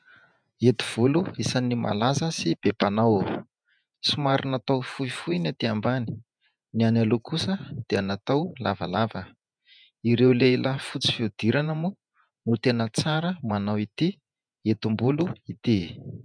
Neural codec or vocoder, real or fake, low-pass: vocoder, 48 kHz, 128 mel bands, Vocos; fake; 9.9 kHz